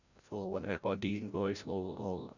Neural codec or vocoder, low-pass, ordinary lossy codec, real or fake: codec, 16 kHz, 0.5 kbps, FreqCodec, larger model; 7.2 kHz; none; fake